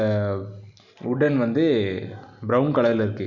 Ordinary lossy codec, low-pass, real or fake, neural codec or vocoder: none; 7.2 kHz; real; none